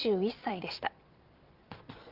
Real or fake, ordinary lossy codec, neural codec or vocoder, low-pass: fake; Opus, 24 kbps; vocoder, 22.05 kHz, 80 mel bands, Vocos; 5.4 kHz